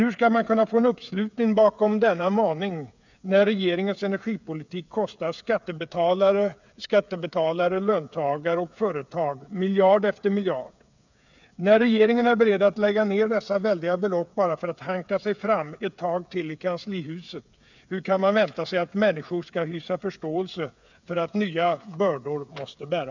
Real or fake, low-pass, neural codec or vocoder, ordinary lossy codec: fake; 7.2 kHz; codec, 16 kHz, 8 kbps, FreqCodec, smaller model; none